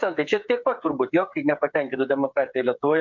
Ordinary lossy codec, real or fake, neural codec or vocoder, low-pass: MP3, 64 kbps; fake; codec, 16 kHz, 8 kbps, FreqCodec, smaller model; 7.2 kHz